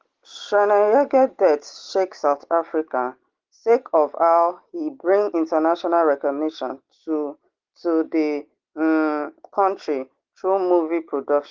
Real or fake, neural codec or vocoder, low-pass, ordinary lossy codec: real; none; 7.2 kHz; Opus, 16 kbps